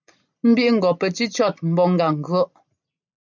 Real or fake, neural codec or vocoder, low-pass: real; none; 7.2 kHz